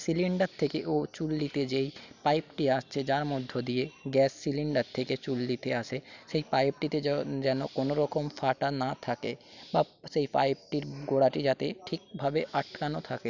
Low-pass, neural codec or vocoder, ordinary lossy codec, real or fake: 7.2 kHz; none; none; real